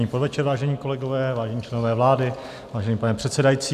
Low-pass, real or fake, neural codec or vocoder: 14.4 kHz; real; none